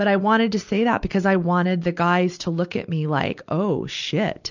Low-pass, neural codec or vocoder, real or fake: 7.2 kHz; none; real